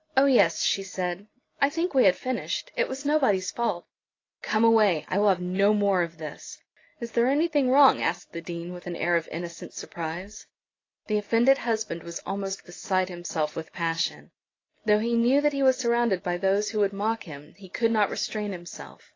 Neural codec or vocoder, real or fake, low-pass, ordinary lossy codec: none; real; 7.2 kHz; AAC, 32 kbps